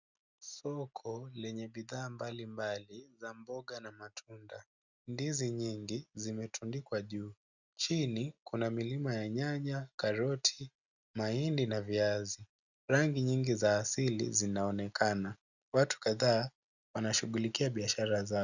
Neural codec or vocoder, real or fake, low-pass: none; real; 7.2 kHz